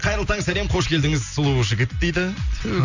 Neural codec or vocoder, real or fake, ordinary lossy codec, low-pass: none; real; none; 7.2 kHz